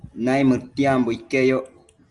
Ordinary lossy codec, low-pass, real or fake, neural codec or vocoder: Opus, 32 kbps; 10.8 kHz; real; none